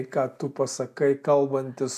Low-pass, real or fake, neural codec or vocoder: 14.4 kHz; real; none